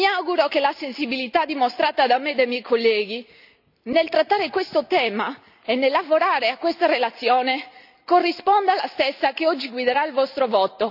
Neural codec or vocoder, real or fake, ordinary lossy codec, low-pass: none; real; none; 5.4 kHz